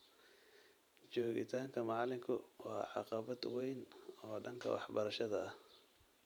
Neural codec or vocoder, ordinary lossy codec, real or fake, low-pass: vocoder, 44.1 kHz, 128 mel bands every 512 samples, BigVGAN v2; none; fake; none